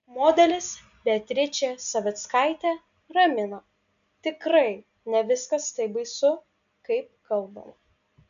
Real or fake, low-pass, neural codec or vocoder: real; 7.2 kHz; none